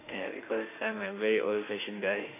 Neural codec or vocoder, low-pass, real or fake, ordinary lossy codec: autoencoder, 48 kHz, 32 numbers a frame, DAC-VAE, trained on Japanese speech; 3.6 kHz; fake; AAC, 24 kbps